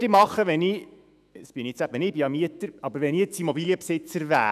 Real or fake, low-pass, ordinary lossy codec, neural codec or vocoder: fake; 14.4 kHz; none; autoencoder, 48 kHz, 128 numbers a frame, DAC-VAE, trained on Japanese speech